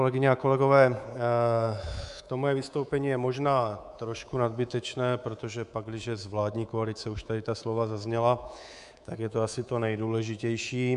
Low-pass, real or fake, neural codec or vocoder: 10.8 kHz; fake; codec, 24 kHz, 3.1 kbps, DualCodec